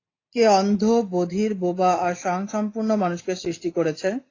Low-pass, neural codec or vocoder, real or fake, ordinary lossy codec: 7.2 kHz; none; real; AAC, 32 kbps